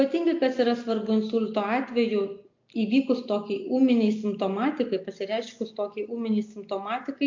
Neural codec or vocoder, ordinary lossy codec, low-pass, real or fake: none; MP3, 48 kbps; 7.2 kHz; real